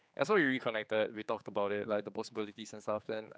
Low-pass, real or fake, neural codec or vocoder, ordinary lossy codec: none; fake; codec, 16 kHz, 4 kbps, X-Codec, HuBERT features, trained on general audio; none